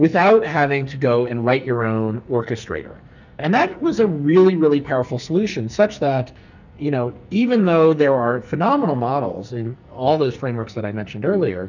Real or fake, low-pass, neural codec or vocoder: fake; 7.2 kHz; codec, 44.1 kHz, 2.6 kbps, SNAC